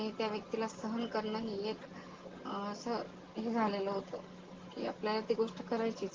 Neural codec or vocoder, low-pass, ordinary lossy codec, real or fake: none; 7.2 kHz; Opus, 16 kbps; real